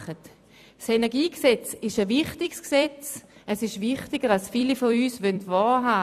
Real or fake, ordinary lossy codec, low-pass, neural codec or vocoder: fake; none; 14.4 kHz; vocoder, 44.1 kHz, 128 mel bands every 256 samples, BigVGAN v2